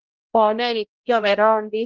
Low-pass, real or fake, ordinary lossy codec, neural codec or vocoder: 7.2 kHz; fake; Opus, 16 kbps; codec, 16 kHz, 0.5 kbps, X-Codec, HuBERT features, trained on balanced general audio